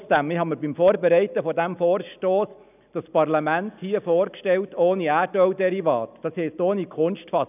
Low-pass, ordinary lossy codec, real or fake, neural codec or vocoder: 3.6 kHz; none; real; none